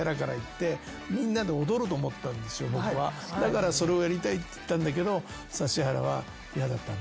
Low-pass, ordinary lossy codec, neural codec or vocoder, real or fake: none; none; none; real